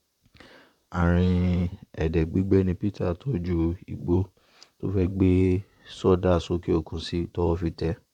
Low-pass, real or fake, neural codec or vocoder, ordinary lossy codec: 19.8 kHz; fake; vocoder, 44.1 kHz, 128 mel bands, Pupu-Vocoder; none